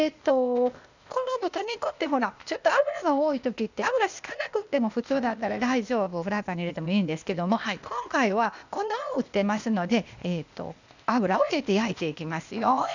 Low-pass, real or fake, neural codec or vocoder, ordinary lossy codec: 7.2 kHz; fake; codec, 16 kHz, 0.8 kbps, ZipCodec; none